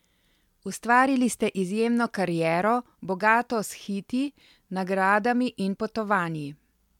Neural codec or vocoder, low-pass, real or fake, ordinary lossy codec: none; 19.8 kHz; real; MP3, 96 kbps